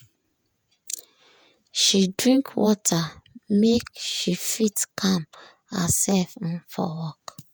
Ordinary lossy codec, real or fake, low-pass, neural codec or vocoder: none; fake; none; vocoder, 48 kHz, 128 mel bands, Vocos